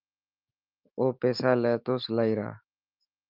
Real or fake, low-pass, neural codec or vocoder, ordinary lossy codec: fake; 5.4 kHz; autoencoder, 48 kHz, 128 numbers a frame, DAC-VAE, trained on Japanese speech; Opus, 24 kbps